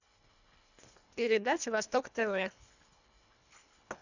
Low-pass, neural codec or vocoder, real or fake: 7.2 kHz; codec, 24 kHz, 1.5 kbps, HILCodec; fake